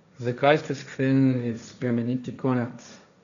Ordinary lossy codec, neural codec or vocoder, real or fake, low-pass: MP3, 96 kbps; codec, 16 kHz, 1.1 kbps, Voila-Tokenizer; fake; 7.2 kHz